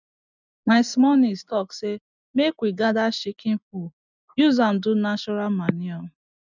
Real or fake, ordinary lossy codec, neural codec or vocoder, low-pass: real; none; none; 7.2 kHz